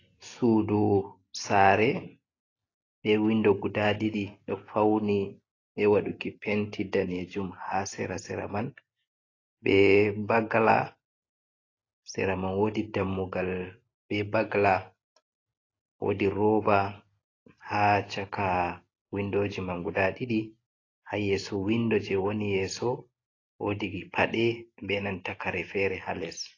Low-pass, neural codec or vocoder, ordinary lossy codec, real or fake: 7.2 kHz; none; AAC, 32 kbps; real